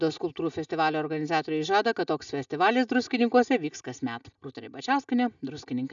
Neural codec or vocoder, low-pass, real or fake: none; 7.2 kHz; real